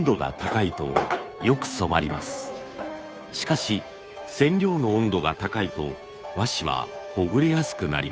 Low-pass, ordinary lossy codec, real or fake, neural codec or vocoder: none; none; fake; codec, 16 kHz, 2 kbps, FunCodec, trained on Chinese and English, 25 frames a second